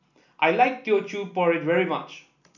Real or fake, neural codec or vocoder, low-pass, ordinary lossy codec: real; none; 7.2 kHz; none